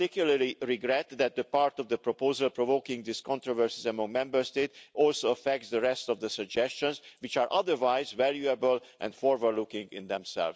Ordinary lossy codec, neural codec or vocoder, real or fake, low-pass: none; none; real; none